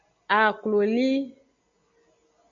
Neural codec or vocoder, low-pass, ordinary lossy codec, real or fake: none; 7.2 kHz; MP3, 64 kbps; real